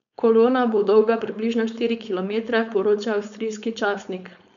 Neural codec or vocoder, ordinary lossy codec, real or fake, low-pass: codec, 16 kHz, 4.8 kbps, FACodec; none; fake; 7.2 kHz